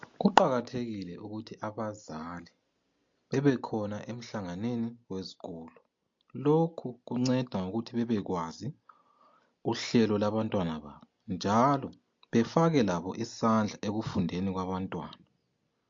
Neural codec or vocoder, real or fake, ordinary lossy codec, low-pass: none; real; MP3, 48 kbps; 7.2 kHz